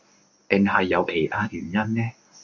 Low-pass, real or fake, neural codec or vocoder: 7.2 kHz; fake; codec, 24 kHz, 0.9 kbps, WavTokenizer, medium speech release version 1